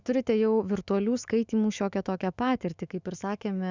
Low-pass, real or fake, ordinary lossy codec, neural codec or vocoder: 7.2 kHz; fake; Opus, 64 kbps; autoencoder, 48 kHz, 128 numbers a frame, DAC-VAE, trained on Japanese speech